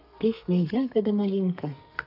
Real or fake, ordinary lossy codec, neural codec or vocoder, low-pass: fake; none; codec, 32 kHz, 1.9 kbps, SNAC; 5.4 kHz